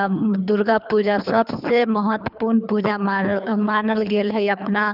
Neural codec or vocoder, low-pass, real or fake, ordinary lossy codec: codec, 24 kHz, 3 kbps, HILCodec; 5.4 kHz; fake; none